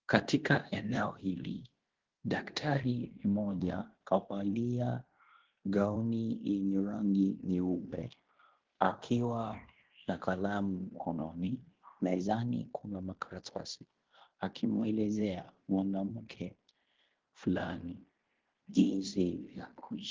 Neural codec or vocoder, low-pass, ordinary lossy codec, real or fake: codec, 16 kHz in and 24 kHz out, 0.9 kbps, LongCat-Audio-Codec, fine tuned four codebook decoder; 7.2 kHz; Opus, 16 kbps; fake